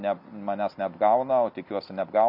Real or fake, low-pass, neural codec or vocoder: real; 5.4 kHz; none